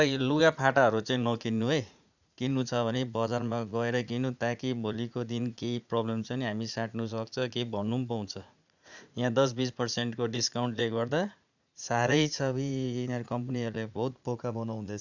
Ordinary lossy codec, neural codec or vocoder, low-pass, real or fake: none; vocoder, 44.1 kHz, 80 mel bands, Vocos; 7.2 kHz; fake